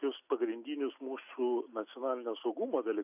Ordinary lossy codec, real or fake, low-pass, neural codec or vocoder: AAC, 32 kbps; real; 3.6 kHz; none